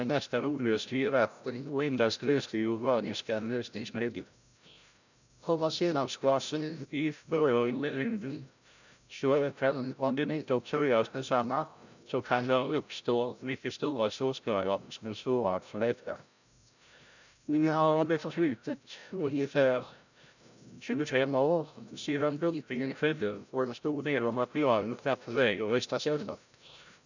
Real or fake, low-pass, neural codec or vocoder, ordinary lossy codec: fake; 7.2 kHz; codec, 16 kHz, 0.5 kbps, FreqCodec, larger model; none